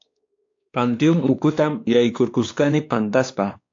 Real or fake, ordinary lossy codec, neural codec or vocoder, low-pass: fake; AAC, 48 kbps; codec, 16 kHz, 2 kbps, X-Codec, WavLM features, trained on Multilingual LibriSpeech; 7.2 kHz